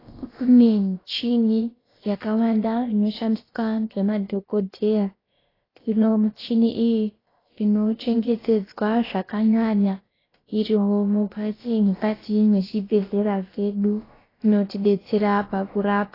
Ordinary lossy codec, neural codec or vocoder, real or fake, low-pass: AAC, 24 kbps; codec, 16 kHz, about 1 kbps, DyCAST, with the encoder's durations; fake; 5.4 kHz